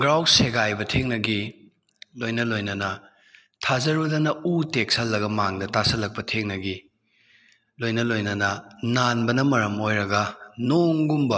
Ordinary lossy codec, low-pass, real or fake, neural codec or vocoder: none; none; real; none